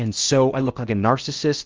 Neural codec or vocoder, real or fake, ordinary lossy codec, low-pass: codec, 16 kHz in and 24 kHz out, 0.8 kbps, FocalCodec, streaming, 65536 codes; fake; Opus, 24 kbps; 7.2 kHz